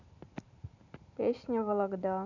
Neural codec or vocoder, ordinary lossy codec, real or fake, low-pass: vocoder, 44.1 kHz, 128 mel bands every 512 samples, BigVGAN v2; none; fake; 7.2 kHz